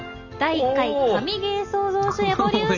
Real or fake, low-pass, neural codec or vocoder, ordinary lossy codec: real; 7.2 kHz; none; none